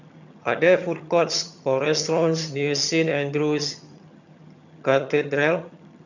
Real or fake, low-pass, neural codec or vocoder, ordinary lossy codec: fake; 7.2 kHz; vocoder, 22.05 kHz, 80 mel bands, HiFi-GAN; none